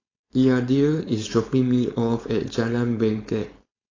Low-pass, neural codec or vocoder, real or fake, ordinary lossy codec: 7.2 kHz; codec, 16 kHz, 4.8 kbps, FACodec; fake; AAC, 32 kbps